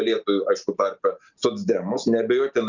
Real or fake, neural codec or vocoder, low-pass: real; none; 7.2 kHz